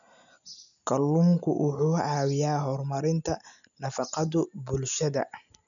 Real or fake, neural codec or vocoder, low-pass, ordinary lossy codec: real; none; 7.2 kHz; none